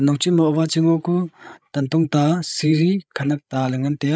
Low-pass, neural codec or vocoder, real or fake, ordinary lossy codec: none; codec, 16 kHz, 8 kbps, FreqCodec, larger model; fake; none